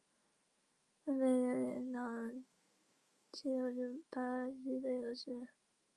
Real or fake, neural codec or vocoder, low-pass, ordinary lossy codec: fake; autoencoder, 48 kHz, 128 numbers a frame, DAC-VAE, trained on Japanese speech; 10.8 kHz; Opus, 32 kbps